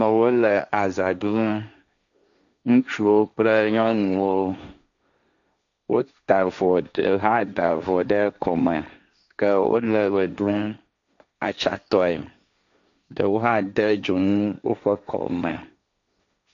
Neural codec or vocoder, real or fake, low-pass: codec, 16 kHz, 1.1 kbps, Voila-Tokenizer; fake; 7.2 kHz